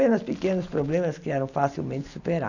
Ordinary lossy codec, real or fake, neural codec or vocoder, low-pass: none; real; none; 7.2 kHz